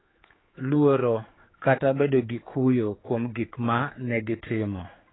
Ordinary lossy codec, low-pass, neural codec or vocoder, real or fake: AAC, 16 kbps; 7.2 kHz; codec, 16 kHz, 4 kbps, X-Codec, HuBERT features, trained on general audio; fake